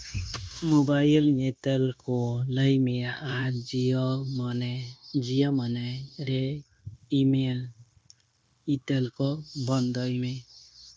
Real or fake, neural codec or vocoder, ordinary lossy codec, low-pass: fake; codec, 16 kHz, 0.9 kbps, LongCat-Audio-Codec; none; none